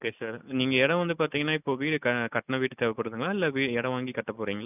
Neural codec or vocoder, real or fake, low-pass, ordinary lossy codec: none; real; 3.6 kHz; none